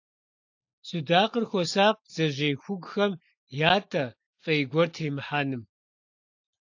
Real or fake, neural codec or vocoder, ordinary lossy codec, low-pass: real; none; AAC, 48 kbps; 7.2 kHz